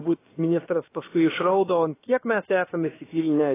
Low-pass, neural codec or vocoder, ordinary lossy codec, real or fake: 3.6 kHz; codec, 16 kHz, about 1 kbps, DyCAST, with the encoder's durations; AAC, 16 kbps; fake